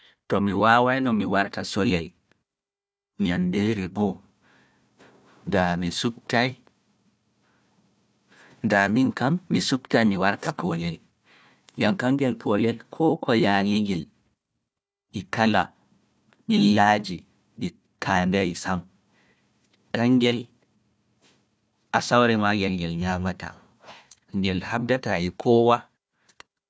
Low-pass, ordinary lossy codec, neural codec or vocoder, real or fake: none; none; codec, 16 kHz, 1 kbps, FunCodec, trained on Chinese and English, 50 frames a second; fake